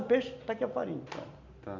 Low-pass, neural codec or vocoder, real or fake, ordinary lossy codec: 7.2 kHz; none; real; none